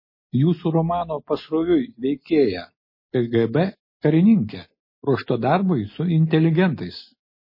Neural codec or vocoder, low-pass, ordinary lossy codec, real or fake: none; 5.4 kHz; MP3, 24 kbps; real